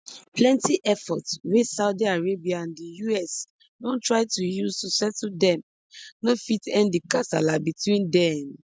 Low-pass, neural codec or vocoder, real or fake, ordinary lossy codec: none; none; real; none